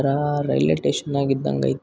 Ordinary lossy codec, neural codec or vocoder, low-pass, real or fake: none; none; none; real